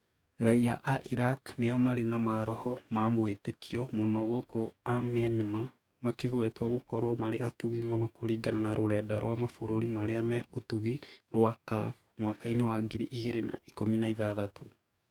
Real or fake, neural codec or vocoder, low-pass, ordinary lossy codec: fake; codec, 44.1 kHz, 2.6 kbps, DAC; 19.8 kHz; none